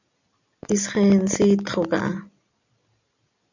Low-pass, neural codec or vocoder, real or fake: 7.2 kHz; none; real